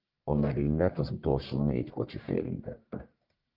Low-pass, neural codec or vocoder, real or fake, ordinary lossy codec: 5.4 kHz; codec, 44.1 kHz, 1.7 kbps, Pupu-Codec; fake; Opus, 24 kbps